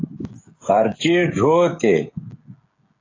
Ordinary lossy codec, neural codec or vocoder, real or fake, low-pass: AAC, 32 kbps; codec, 16 kHz, 16 kbps, FreqCodec, smaller model; fake; 7.2 kHz